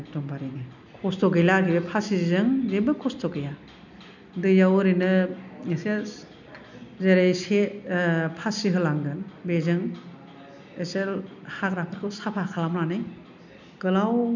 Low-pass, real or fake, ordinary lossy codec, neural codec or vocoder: 7.2 kHz; real; none; none